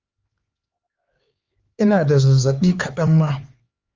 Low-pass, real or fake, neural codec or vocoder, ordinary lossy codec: 7.2 kHz; fake; codec, 16 kHz, 4 kbps, X-Codec, HuBERT features, trained on LibriSpeech; Opus, 32 kbps